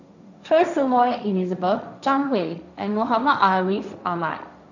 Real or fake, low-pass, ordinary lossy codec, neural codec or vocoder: fake; 7.2 kHz; none; codec, 16 kHz, 1.1 kbps, Voila-Tokenizer